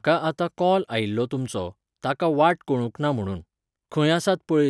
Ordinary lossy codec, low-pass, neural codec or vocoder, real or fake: none; none; none; real